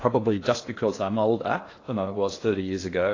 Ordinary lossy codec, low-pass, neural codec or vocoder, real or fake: AAC, 32 kbps; 7.2 kHz; codec, 16 kHz in and 24 kHz out, 0.8 kbps, FocalCodec, streaming, 65536 codes; fake